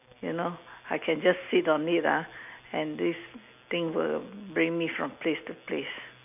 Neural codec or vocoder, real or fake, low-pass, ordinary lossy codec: none; real; 3.6 kHz; none